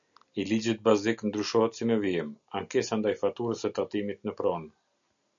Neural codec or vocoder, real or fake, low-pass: none; real; 7.2 kHz